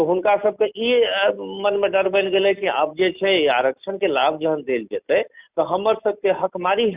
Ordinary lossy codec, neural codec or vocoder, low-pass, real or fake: Opus, 64 kbps; none; 3.6 kHz; real